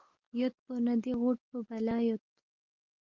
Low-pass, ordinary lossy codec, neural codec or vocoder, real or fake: 7.2 kHz; Opus, 16 kbps; none; real